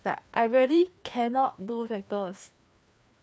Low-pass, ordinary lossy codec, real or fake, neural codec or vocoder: none; none; fake; codec, 16 kHz, 2 kbps, FreqCodec, larger model